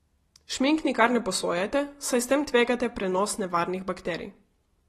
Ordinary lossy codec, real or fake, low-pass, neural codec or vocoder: AAC, 32 kbps; real; 19.8 kHz; none